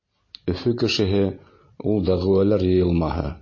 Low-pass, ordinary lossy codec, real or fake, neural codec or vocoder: 7.2 kHz; MP3, 32 kbps; real; none